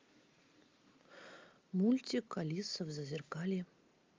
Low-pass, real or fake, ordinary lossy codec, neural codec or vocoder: 7.2 kHz; real; Opus, 24 kbps; none